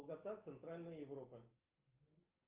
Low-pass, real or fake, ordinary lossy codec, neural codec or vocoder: 3.6 kHz; real; Opus, 24 kbps; none